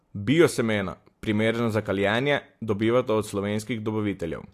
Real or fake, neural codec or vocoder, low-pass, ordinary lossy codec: real; none; 14.4 kHz; AAC, 64 kbps